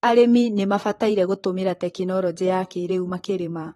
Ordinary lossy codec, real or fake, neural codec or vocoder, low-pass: AAC, 32 kbps; fake; vocoder, 44.1 kHz, 128 mel bands, Pupu-Vocoder; 19.8 kHz